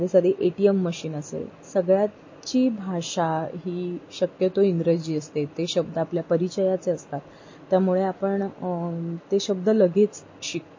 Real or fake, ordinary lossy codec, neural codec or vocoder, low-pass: real; MP3, 32 kbps; none; 7.2 kHz